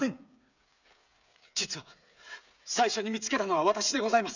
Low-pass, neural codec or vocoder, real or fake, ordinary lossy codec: 7.2 kHz; codec, 16 kHz, 8 kbps, FreqCodec, smaller model; fake; none